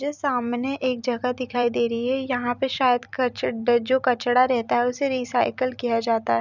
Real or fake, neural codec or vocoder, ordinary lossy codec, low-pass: fake; vocoder, 44.1 kHz, 128 mel bands every 256 samples, BigVGAN v2; none; 7.2 kHz